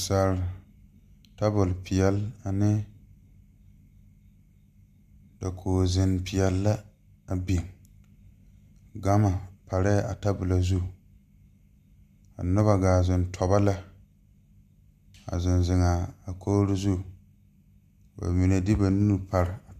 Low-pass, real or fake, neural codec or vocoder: 14.4 kHz; real; none